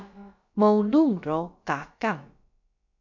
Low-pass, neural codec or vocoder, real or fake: 7.2 kHz; codec, 16 kHz, about 1 kbps, DyCAST, with the encoder's durations; fake